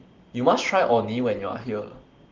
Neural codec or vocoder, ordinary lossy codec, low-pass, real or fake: none; Opus, 16 kbps; 7.2 kHz; real